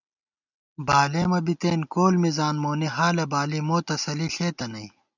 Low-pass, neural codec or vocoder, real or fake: 7.2 kHz; none; real